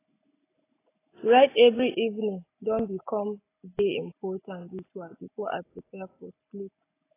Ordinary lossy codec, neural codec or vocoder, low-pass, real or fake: AAC, 24 kbps; none; 3.6 kHz; real